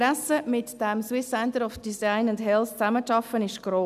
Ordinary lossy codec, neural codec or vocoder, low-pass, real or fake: none; none; 14.4 kHz; real